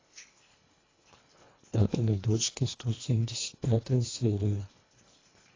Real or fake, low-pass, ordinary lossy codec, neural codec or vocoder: fake; 7.2 kHz; AAC, 32 kbps; codec, 24 kHz, 1.5 kbps, HILCodec